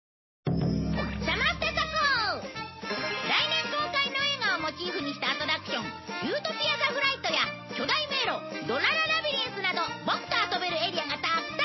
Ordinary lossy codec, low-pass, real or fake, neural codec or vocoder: MP3, 24 kbps; 7.2 kHz; real; none